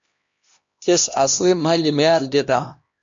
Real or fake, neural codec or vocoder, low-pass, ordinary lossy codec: fake; codec, 16 kHz, 1 kbps, X-Codec, HuBERT features, trained on LibriSpeech; 7.2 kHz; MP3, 48 kbps